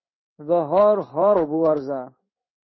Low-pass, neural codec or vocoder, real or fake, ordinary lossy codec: 7.2 kHz; codec, 16 kHz in and 24 kHz out, 1 kbps, XY-Tokenizer; fake; MP3, 24 kbps